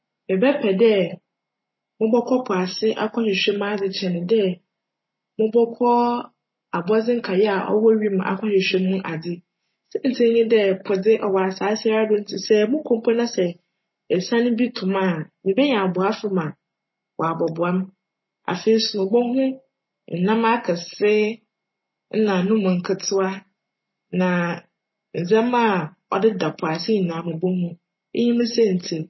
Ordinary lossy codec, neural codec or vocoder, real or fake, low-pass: MP3, 24 kbps; none; real; 7.2 kHz